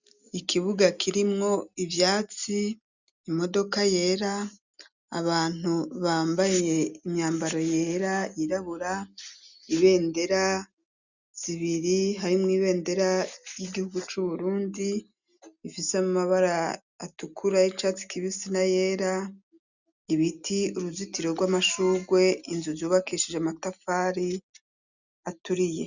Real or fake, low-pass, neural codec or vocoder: real; 7.2 kHz; none